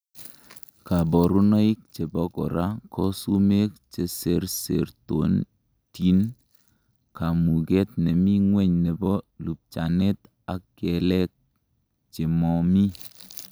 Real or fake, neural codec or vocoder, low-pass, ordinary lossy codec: real; none; none; none